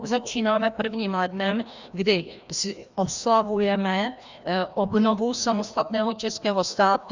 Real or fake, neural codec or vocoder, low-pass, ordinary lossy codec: fake; codec, 16 kHz, 1 kbps, FreqCodec, larger model; 7.2 kHz; Opus, 64 kbps